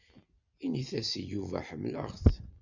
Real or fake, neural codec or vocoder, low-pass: real; none; 7.2 kHz